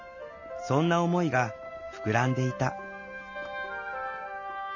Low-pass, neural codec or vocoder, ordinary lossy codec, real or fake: 7.2 kHz; none; none; real